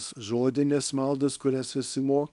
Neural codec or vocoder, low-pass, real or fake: codec, 24 kHz, 0.9 kbps, WavTokenizer, small release; 10.8 kHz; fake